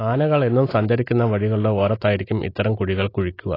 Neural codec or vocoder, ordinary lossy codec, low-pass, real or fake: none; AAC, 24 kbps; 5.4 kHz; real